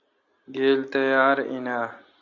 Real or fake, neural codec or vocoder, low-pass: real; none; 7.2 kHz